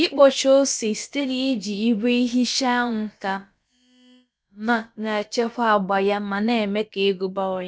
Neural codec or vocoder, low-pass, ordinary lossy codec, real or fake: codec, 16 kHz, about 1 kbps, DyCAST, with the encoder's durations; none; none; fake